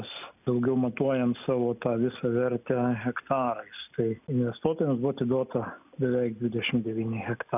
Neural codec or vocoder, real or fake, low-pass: none; real; 3.6 kHz